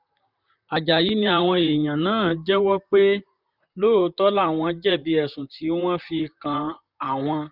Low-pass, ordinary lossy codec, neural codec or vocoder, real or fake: 5.4 kHz; none; vocoder, 44.1 kHz, 128 mel bands, Pupu-Vocoder; fake